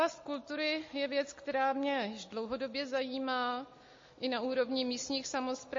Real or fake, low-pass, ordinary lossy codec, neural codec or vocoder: real; 7.2 kHz; MP3, 32 kbps; none